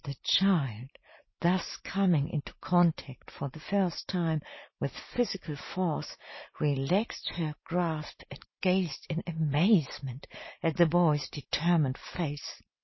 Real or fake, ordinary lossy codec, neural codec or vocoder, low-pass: real; MP3, 24 kbps; none; 7.2 kHz